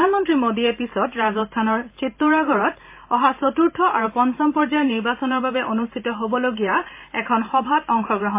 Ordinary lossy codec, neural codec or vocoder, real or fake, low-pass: MP3, 24 kbps; vocoder, 44.1 kHz, 128 mel bands every 512 samples, BigVGAN v2; fake; 3.6 kHz